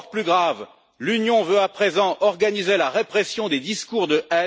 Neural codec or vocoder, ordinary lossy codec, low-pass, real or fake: none; none; none; real